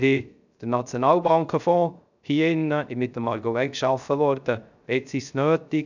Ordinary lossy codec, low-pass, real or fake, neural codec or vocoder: none; 7.2 kHz; fake; codec, 16 kHz, 0.3 kbps, FocalCodec